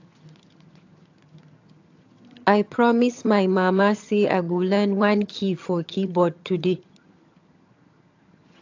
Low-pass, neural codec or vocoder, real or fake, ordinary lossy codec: 7.2 kHz; vocoder, 22.05 kHz, 80 mel bands, HiFi-GAN; fake; AAC, 48 kbps